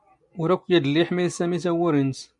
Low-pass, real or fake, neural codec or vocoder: 10.8 kHz; real; none